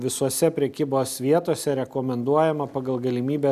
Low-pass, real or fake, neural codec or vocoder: 14.4 kHz; real; none